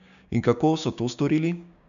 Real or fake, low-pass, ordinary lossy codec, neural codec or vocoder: fake; 7.2 kHz; none; codec, 16 kHz, 6 kbps, DAC